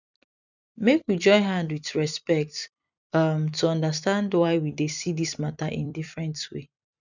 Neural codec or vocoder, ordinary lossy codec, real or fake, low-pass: vocoder, 44.1 kHz, 128 mel bands every 256 samples, BigVGAN v2; none; fake; 7.2 kHz